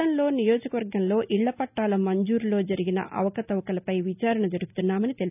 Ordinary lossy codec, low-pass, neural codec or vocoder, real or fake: none; 3.6 kHz; none; real